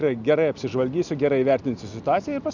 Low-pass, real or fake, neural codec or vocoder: 7.2 kHz; real; none